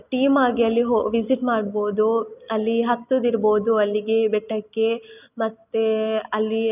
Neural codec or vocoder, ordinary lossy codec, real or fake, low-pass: none; none; real; 3.6 kHz